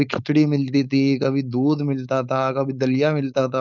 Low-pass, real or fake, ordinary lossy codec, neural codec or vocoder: 7.2 kHz; fake; none; codec, 16 kHz, 4.8 kbps, FACodec